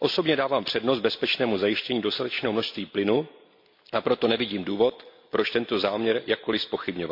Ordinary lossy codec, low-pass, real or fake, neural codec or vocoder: MP3, 48 kbps; 5.4 kHz; real; none